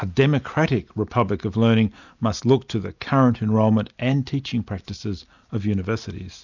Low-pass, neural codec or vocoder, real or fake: 7.2 kHz; none; real